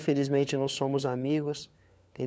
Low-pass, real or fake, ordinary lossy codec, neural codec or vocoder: none; fake; none; codec, 16 kHz, 4 kbps, FunCodec, trained on LibriTTS, 50 frames a second